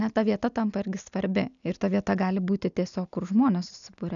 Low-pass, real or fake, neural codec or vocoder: 7.2 kHz; real; none